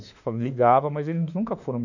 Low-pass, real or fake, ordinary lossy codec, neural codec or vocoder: 7.2 kHz; fake; none; autoencoder, 48 kHz, 32 numbers a frame, DAC-VAE, trained on Japanese speech